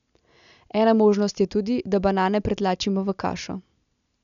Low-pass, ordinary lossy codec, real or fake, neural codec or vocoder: 7.2 kHz; none; real; none